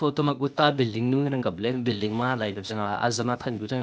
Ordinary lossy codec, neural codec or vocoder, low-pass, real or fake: none; codec, 16 kHz, 0.8 kbps, ZipCodec; none; fake